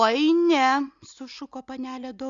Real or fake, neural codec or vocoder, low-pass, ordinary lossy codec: real; none; 7.2 kHz; Opus, 64 kbps